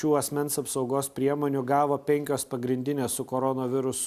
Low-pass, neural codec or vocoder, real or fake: 14.4 kHz; none; real